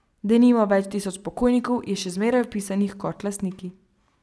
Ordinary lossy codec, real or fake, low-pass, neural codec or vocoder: none; real; none; none